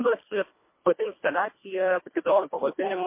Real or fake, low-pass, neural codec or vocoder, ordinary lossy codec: fake; 3.6 kHz; codec, 24 kHz, 1.5 kbps, HILCodec; MP3, 24 kbps